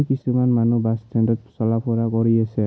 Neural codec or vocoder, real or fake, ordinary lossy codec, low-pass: none; real; none; none